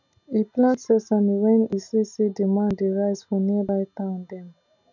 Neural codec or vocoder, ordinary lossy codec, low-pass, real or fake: none; none; 7.2 kHz; real